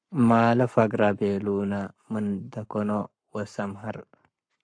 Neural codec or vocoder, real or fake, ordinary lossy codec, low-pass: codec, 44.1 kHz, 7.8 kbps, Pupu-Codec; fake; AAC, 64 kbps; 9.9 kHz